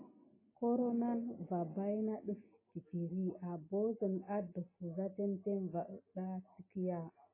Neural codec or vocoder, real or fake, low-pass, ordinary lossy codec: none; real; 3.6 kHz; MP3, 16 kbps